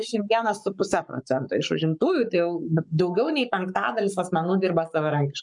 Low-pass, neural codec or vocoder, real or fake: 10.8 kHz; codec, 44.1 kHz, 7.8 kbps, Pupu-Codec; fake